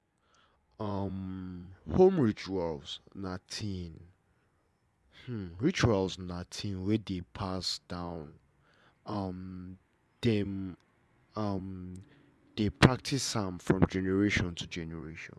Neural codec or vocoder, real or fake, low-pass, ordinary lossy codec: vocoder, 24 kHz, 100 mel bands, Vocos; fake; none; none